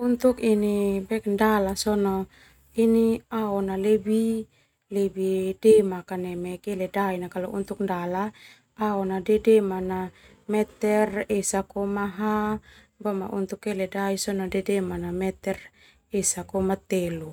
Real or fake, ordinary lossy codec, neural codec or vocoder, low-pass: real; none; none; 19.8 kHz